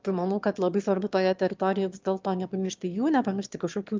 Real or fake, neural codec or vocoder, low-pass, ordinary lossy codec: fake; autoencoder, 22.05 kHz, a latent of 192 numbers a frame, VITS, trained on one speaker; 7.2 kHz; Opus, 24 kbps